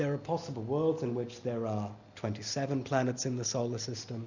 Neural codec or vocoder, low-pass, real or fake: none; 7.2 kHz; real